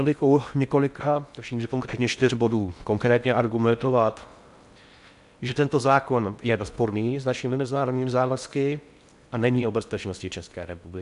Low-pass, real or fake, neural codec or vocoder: 10.8 kHz; fake; codec, 16 kHz in and 24 kHz out, 0.6 kbps, FocalCodec, streaming, 4096 codes